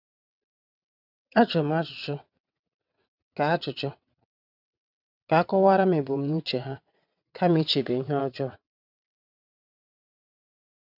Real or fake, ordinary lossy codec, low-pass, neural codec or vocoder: fake; none; 5.4 kHz; vocoder, 22.05 kHz, 80 mel bands, WaveNeXt